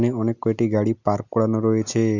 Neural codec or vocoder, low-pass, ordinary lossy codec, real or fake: none; 7.2 kHz; none; real